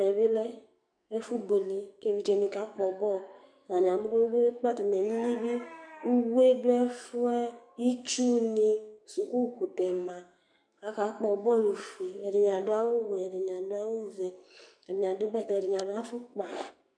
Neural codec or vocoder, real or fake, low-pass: codec, 44.1 kHz, 2.6 kbps, SNAC; fake; 9.9 kHz